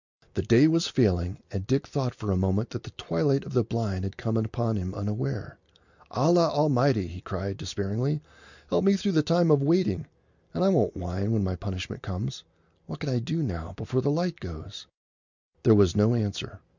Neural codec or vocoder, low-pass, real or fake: none; 7.2 kHz; real